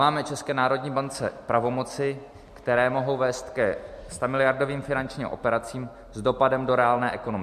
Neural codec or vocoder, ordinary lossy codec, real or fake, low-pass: none; MP3, 64 kbps; real; 14.4 kHz